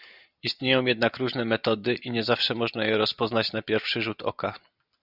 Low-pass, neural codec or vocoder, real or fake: 5.4 kHz; none; real